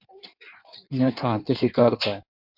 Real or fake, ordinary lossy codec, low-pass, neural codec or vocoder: fake; MP3, 48 kbps; 5.4 kHz; codec, 16 kHz in and 24 kHz out, 1.1 kbps, FireRedTTS-2 codec